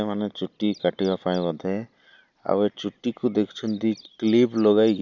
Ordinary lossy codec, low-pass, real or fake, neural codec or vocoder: none; 7.2 kHz; real; none